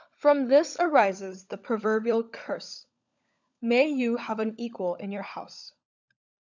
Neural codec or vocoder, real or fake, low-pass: codec, 16 kHz, 16 kbps, FunCodec, trained on LibriTTS, 50 frames a second; fake; 7.2 kHz